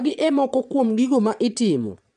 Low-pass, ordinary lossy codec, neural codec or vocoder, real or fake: 9.9 kHz; none; vocoder, 22.05 kHz, 80 mel bands, Vocos; fake